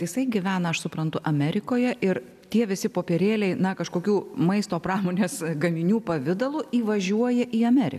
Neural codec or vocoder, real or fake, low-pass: none; real; 14.4 kHz